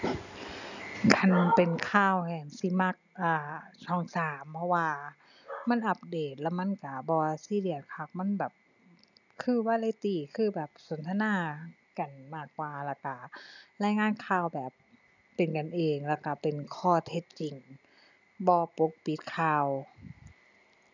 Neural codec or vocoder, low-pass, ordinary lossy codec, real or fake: none; 7.2 kHz; none; real